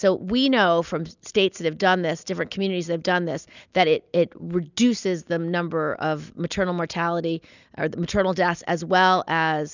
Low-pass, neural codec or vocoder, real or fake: 7.2 kHz; none; real